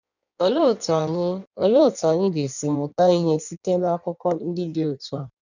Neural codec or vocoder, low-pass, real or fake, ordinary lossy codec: codec, 16 kHz in and 24 kHz out, 1.1 kbps, FireRedTTS-2 codec; 7.2 kHz; fake; none